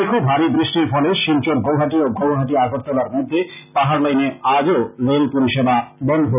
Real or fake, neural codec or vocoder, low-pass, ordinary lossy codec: real; none; 3.6 kHz; none